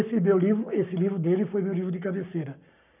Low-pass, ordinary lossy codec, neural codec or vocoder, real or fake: 3.6 kHz; none; codec, 44.1 kHz, 7.8 kbps, Pupu-Codec; fake